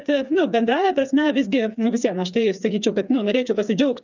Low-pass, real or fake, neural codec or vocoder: 7.2 kHz; fake; codec, 16 kHz, 4 kbps, FreqCodec, smaller model